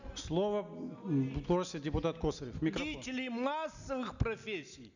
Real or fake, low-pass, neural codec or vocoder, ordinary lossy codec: real; 7.2 kHz; none; none